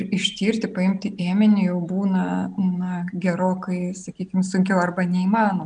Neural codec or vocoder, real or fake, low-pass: none; real; 9.9 kHz